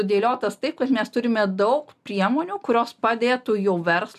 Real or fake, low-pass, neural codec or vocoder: real; 14.4 kHz; none